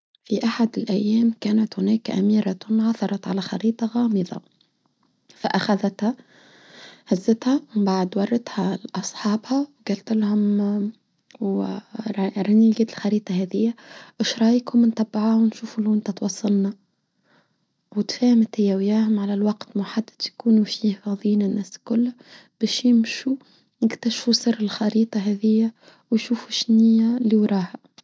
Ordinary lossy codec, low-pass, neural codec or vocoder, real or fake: none; none; none; real